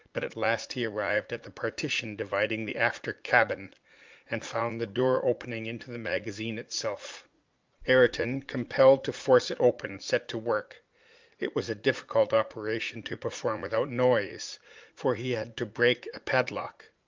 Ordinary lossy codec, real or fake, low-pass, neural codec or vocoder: Opus, 24 kbps; fake; 7.2 kHz; vocoder, 44.1 kHz, 80 mel bands, Vocos